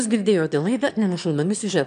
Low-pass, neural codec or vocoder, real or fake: 9.9 kHz; autoencoder, 22.05 kHz, a latent of 192 numbers a frame, VITS, trained on one speaker; fake